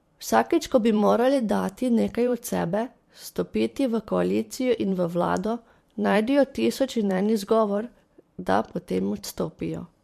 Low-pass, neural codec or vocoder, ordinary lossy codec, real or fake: 14.4 kHz; vocoder, 44.1 kHz, 128 mel bands every 512 samples, BigVGAN v2; MP3, 64 kbps; fake